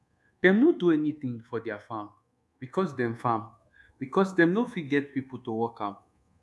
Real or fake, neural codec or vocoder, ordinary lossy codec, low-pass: fake; codec, 24 kHz, 1.2 kbps, DualCodec; none; none